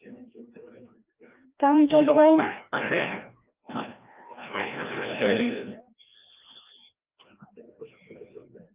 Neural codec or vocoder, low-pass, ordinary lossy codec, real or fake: codec, 16 kHz, 1 kbps, FreqCodec, larger model; 3.6 kHz; Opus, 24 kbps; fake